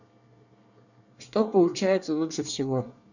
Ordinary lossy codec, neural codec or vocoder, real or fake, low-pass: none; codec, 24 kHz, 1 kbps, SNAC; fake; 7.2 kHz